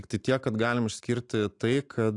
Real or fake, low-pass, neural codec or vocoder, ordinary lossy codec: real; 10.8 kHz; none; AAC, 64 kbps